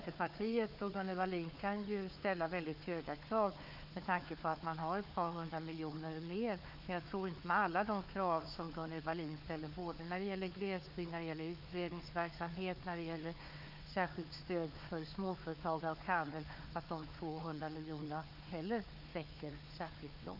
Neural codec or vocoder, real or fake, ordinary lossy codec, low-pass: codec, 16 kHz, 4 kbps, FunCodec, trained on Chinese and English, 50 frames a second; fake; none; 5.4 kHz